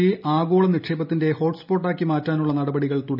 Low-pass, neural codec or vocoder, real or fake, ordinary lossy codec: 5.4 kHz; none; real; none